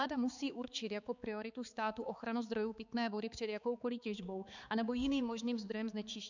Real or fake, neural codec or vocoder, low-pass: fake; codec, 16 kHz, 4 kbps, X-Codec, HuBERT features, trained on balanced general audio; 7.2 kHz